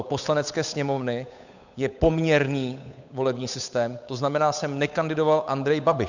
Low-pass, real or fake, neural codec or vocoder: 7.2 kHz; fake; codec, 16 kHz, 8 kbps, FunCodec, trained on Chinese and English, 25 frames a second